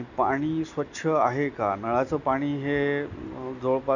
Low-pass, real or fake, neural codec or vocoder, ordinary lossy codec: 7.2 kHz; real; none; none